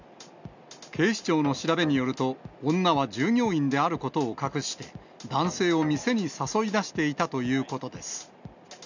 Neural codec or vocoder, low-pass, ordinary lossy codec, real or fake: none; 7.2 kHz; none; real